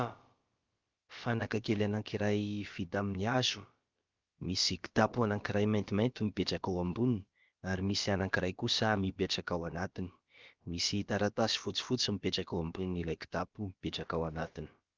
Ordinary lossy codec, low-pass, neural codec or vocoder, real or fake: Opus, 32 kbps; 7.2 kHz; codec, 16 kHz, about 1 kbps, DyCAST, with the encoder's durations; fake